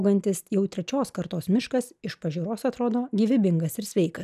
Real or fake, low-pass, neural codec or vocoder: real; 14.4 kHz; none